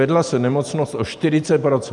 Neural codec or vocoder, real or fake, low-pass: vocoder, 44.1 kHz, 128 mel bands every 512 samples, BigVGAN v2; fake; 10.8 kHz